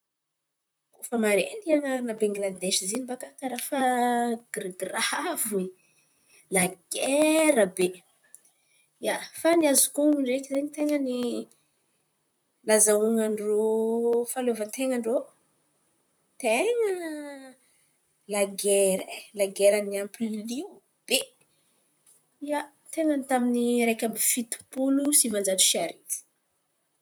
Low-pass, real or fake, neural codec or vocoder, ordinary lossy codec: none; fake; vocoder, 44.1 kHz, 128 mel bands, Pupu-Vocoder; none